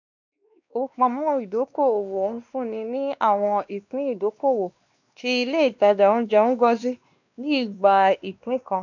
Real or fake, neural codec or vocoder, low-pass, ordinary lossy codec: fake; codec, 16 kHz, 2 kbps, X-Codec, WavLM features, trained on Multilingual LibriSpeech; 7.2 kHz; none